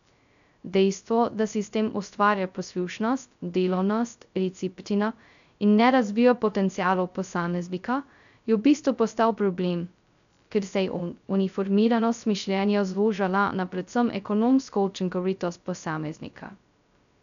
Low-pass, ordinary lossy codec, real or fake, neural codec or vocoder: 7.2 kHz; none; fake; codec, 16 kHz, 0.2 kbps, FocalCodec